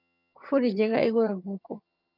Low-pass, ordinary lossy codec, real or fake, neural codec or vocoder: 5.4 kHz; none; fake; vocoder, 22.05 kHz, 80 mel bands, HiFi-GAN